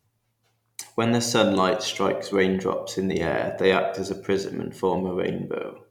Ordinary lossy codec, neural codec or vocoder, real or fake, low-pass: none; none; real; 19.8 kHz